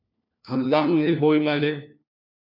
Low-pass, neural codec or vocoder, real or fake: 5.4 kHz; codec, 16 kHz, 1 kbps, FunCodec, trained on LibriTTS, 50 frames a second; fake